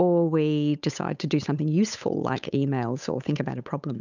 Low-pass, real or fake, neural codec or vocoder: 7.2 kHz; fake; codec, 16 kHz, 8 kbps, FunCodec, trained on Chinese and English, 25 frames a second